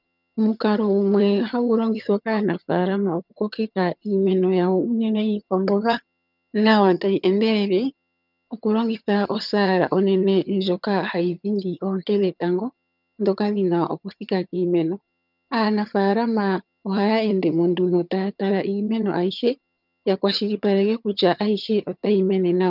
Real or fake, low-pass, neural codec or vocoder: fake; 5.4 kHz; vocoder, 22.05 kHz, 80 mel bands, HiFi-GAN